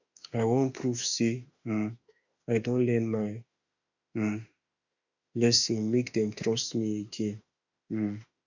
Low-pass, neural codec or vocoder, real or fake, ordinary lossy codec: 7.2 kHz; autoencoder, 48 kHz, 32 numbers a frame, DAC-VAE, trained on Japanese speech; fake; none